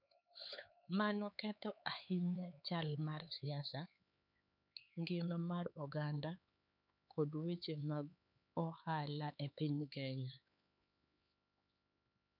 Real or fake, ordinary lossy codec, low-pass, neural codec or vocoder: fake; none; 5.4 kHz; codec, 16 kHz, 4 kbps, X-Codec, HuBERT features, trained on LibriSpeech